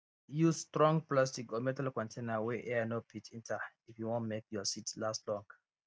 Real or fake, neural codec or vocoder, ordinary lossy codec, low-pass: real; none; none; none